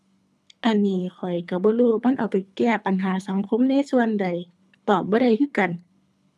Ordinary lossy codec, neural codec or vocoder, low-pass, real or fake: none; codec, 24 kHz, 3 kbps, HILCodec; none; fake